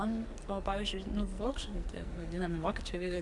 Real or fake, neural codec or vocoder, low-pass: fake; codec, 44.1 kHz, 2.6 kbps, SNAC; 10.8 kHz